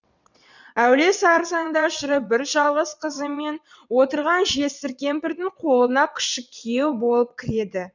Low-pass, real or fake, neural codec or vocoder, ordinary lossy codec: 7.2 kHz; fake; vocoder, 22.05 kHz, 80 mel bands, WaveNeXt; none